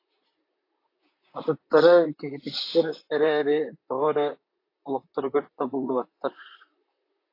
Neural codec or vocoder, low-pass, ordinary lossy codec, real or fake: vocoder, 44.1 kHz, 128 mel bands, Pupu-Vocoder; 5.4 kHz; AAC, 32 kbps; fake